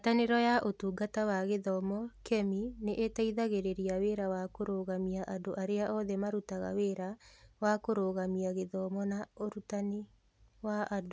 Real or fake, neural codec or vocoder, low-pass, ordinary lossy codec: real; none; none; none